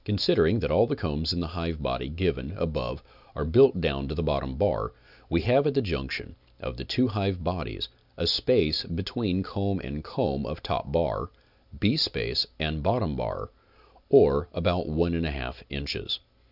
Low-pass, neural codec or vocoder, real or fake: 5.4 kHz; none; real